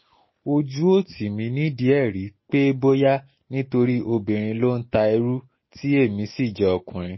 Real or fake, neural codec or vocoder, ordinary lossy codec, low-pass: real; none; MP3, 24 kbps; 7.2 kHz